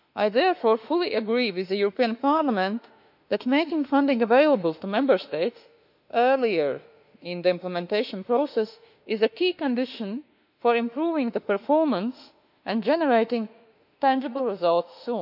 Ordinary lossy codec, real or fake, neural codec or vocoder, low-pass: none; fake; autoencoder, 48 kHz, 32 numbers a frame, DAC-VAE, trained on Japanese speech; 5.4 kHz